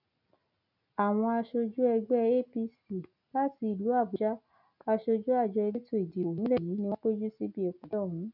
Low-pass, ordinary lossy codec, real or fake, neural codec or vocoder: 5.4 kHz; none; real; none